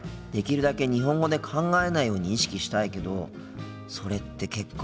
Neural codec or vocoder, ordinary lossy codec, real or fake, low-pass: none; none; real; none